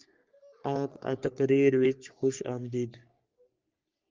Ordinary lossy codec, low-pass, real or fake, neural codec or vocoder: Opus, 24 kbps; 7.2 kHz; fake; codec, 44.1 kHz, 3.4 kbps, Pupu-Codec